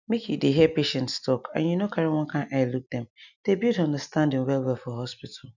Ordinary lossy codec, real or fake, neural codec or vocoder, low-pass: none; real; none; 7.2 kHz